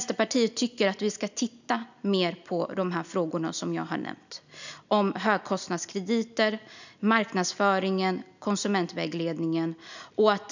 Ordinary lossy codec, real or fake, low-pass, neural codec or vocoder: none; real; 7.2 kHz; none